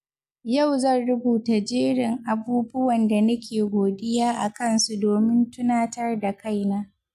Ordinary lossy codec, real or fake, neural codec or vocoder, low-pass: none; real; none; 14.4 kHz